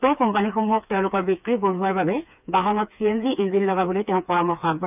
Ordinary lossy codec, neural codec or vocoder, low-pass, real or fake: none; codec, 16 kHz, 4 kbps, FreqCodec, smaller model; 3.6 kHz; fake